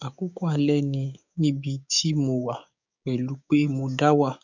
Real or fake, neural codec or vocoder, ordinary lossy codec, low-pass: fake; codec, 16 kHz, 6 kbps, DAC; none; 7.2 kHz